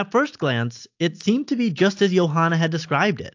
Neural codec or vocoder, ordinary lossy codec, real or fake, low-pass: none; AAC, 48 kbps; real; 7.2 kHz